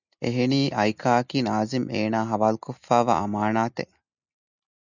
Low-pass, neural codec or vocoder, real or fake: 7.2 kHz; none; real